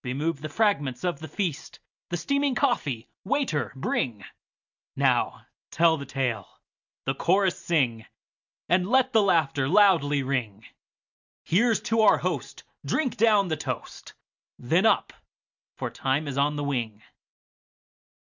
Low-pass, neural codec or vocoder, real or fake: 7.2 kHz; none; real